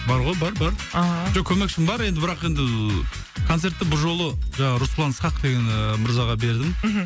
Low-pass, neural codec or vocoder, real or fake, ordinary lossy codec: none; none; real; none